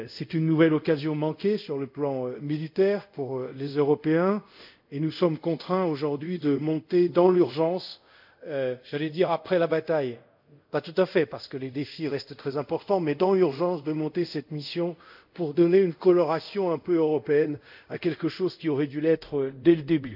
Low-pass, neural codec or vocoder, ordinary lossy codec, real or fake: 5.4 kHz; codec, 24 kHz, 0.5 kbps, DualCodec; none; fake